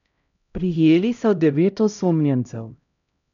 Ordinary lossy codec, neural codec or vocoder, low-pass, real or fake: none; codec, 16 kHz, 0.5 kbps, X-Codec, HuBERT features, trained on LibriSpeech; 7.2 kHz; fake